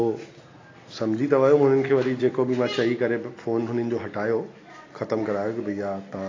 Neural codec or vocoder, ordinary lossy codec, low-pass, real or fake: none; AAC, 32 kbps; 7.2 kHz; real